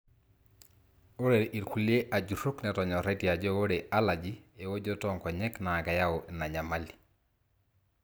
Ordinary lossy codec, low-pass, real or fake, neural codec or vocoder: none; none; real; none